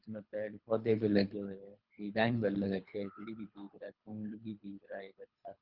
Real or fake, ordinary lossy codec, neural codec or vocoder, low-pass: fake; Opus, 24 kbps; codec, 24 kHz, 3 kbps, HILCodec; 5.4 kHz